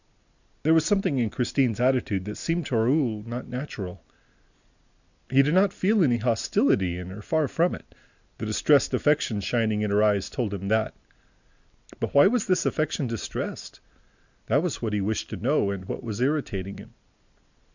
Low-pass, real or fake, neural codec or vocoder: 7.2 kHz; real; none